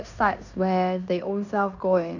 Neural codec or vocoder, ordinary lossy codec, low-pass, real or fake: codec, 16 kHz in and 24 kHz out, 0.9 kbps, LongCat-Audio-Codec, fine tuned four codebook decoder; none; 7.2 kHz; fake